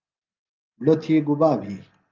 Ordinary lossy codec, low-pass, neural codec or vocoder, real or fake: Opus, 32 kbps; 7.2 kHz; none; real